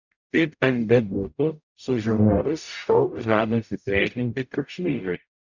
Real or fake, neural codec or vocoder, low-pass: fake; codec, 44.1 kHz, 0.9 kbps, DAC; 7.2 kHz